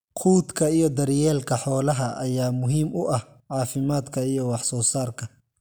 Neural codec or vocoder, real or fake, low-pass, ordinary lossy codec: none; real; none; none